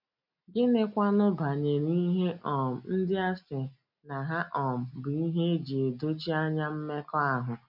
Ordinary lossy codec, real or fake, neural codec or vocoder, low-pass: none; real; none; 5.4 kHz